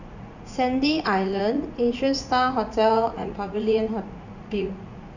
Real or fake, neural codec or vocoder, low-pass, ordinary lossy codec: fake; vocoder, 44.1 kHz, 80 mel bands, Vocos; 7.2 kHz; none